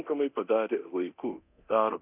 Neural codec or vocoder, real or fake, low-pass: codec, 24 kHz, 0.9 kbps, DualCodec; fake; 3.6 kHz